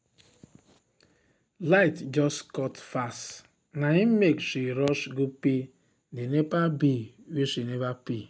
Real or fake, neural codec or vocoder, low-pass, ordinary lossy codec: real; none; none; none